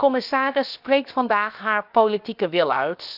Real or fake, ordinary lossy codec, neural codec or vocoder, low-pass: fake; none; codec, 16 kHz, 0.7 kbps, FocalCodec; 5.4 kHz